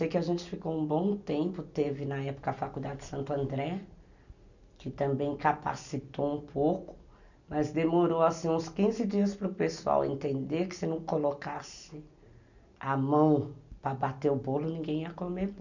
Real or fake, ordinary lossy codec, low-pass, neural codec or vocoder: real; none; 7.2 kHz; none